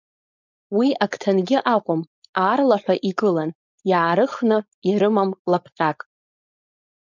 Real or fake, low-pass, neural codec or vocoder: fake; 7.2 kHz; codec, 16 kHz, 4.8 kbps, FACodec